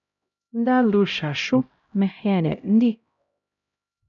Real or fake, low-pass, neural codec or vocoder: fake; 7.2 kHz; codec, 16 kHz, 0.5 kbps, X-Codec, HuBERT features, trained on LibriSpeech